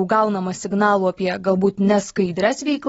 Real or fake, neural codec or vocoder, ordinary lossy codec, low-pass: real; none; AAC, 24 kbps; 10.8 kHz